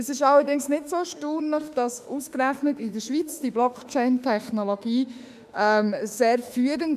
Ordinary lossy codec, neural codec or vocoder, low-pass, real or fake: none; autoencoder, 48 kHz, 32 numbers a frame, DAC-VAE, trained on Japanese speech; 14.4 kHz; fake